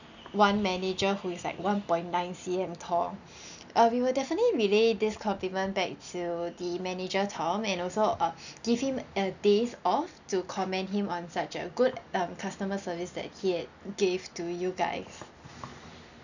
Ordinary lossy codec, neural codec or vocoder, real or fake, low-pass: none; none; real; 7.2 kHz